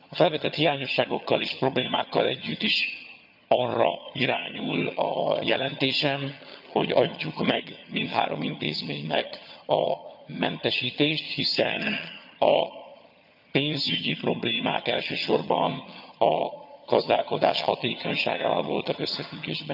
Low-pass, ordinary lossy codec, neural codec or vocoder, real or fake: 5.4 kHz; none; vocoder, 22.05 kHz, 80 mel bands, HiFi-GAN; fake